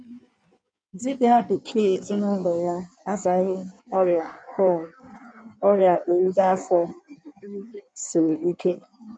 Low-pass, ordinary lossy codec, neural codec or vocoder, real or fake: 9.9 kHz; none; codec, 16 kHz in and 24 kHz out, 1.1 kbps, FireRedTTS-2 codec; fake